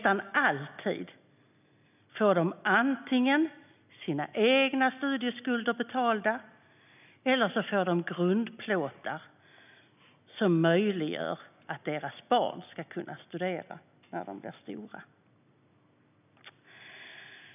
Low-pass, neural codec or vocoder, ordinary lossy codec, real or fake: 3.6 kHz; none; none; real